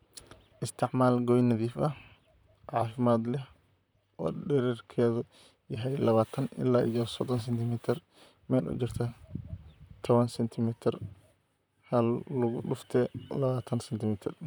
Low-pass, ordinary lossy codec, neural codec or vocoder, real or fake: none; none; vocoder, 44.1 kHz, 128 mel bands, Pupu-Vocoder; fake